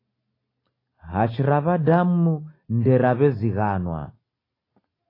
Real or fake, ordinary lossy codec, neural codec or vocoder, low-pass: real; AAC, 24 kbps; none; 5.4 kHz